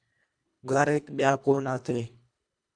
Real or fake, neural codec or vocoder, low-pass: fake; codec, 24 kHz, 1.5 kbps, HILCodec; 9.9 kHz